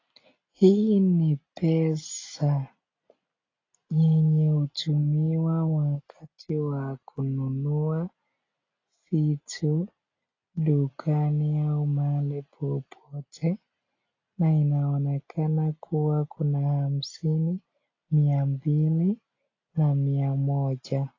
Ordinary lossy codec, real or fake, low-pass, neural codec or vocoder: AAC, 32 kbps; real; 7.2 kHz; none